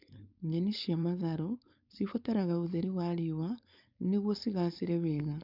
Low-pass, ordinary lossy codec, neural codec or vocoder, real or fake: 5.4 kHz; none; codec, 16 kHz, 4.8 kbps, FACodec; fake